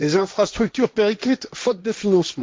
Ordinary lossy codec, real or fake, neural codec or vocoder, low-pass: none; fake; codec, 16 kHz, 1.1 kbps, Voila-Tokenizer; none